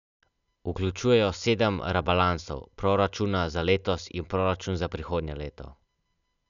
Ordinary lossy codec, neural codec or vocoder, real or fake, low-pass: MP3, 96 kbps; none; real; 7.2 kHz